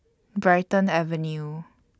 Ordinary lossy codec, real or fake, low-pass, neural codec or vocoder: none; real; none; none